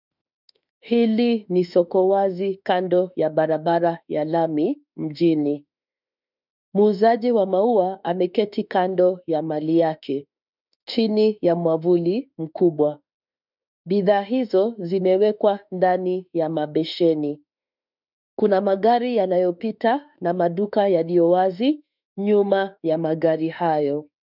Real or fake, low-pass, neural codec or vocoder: fake; 5.4 kHz; autoencoder, 48 kHz, 32 numbers a frame, DAC-VAE, trained on Japanese speech